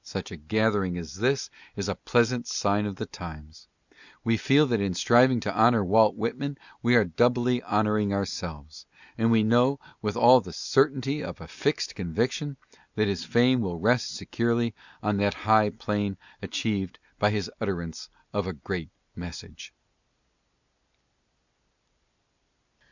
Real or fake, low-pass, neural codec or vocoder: real; 7.2 kHz; none